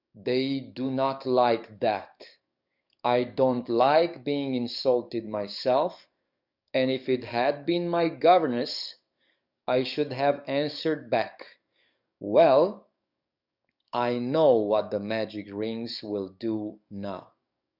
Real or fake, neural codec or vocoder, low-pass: fake; codec, 44.1 kHz, 7.8 kbps, DAC; 5.4 kHz